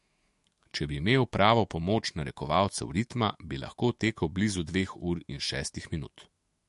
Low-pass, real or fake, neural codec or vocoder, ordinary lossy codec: 14.4 kHz; fake; autoencoder, 48 kHz, 128 numbers a frame, DAC-VAE, trained on Japanese speech; MP3, 48 kbps